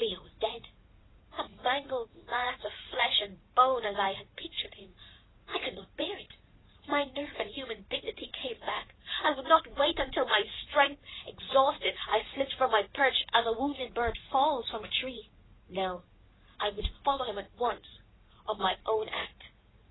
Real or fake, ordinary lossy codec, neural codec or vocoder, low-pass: fake; AAC, 16 kbps; vocoder, 44.1 kHz, 80 mel bands, Vocos; 7.2 kHz